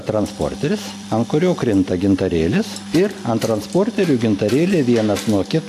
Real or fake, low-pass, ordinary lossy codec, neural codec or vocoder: real; 14.4 kHz; AAC, 64 kbps; none